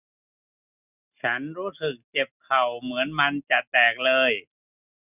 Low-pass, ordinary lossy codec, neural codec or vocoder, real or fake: 3.6 kHz; none; none; real